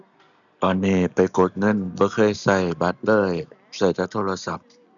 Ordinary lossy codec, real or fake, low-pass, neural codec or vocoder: none; real; 7.2 kHz; none